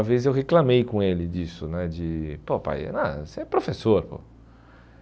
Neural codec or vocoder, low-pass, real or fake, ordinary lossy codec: none; none; real; none